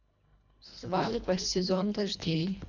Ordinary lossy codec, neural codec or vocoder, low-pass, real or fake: none; codec, 24 kHz, 1.5 kbps, HILCodec; 7.2 kHz; fake